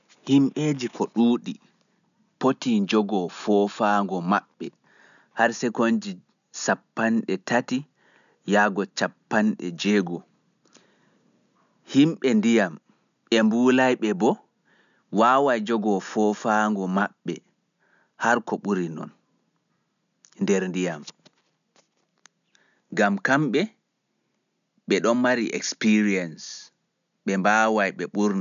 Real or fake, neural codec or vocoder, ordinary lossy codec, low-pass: real; none; none; 7.2 kHz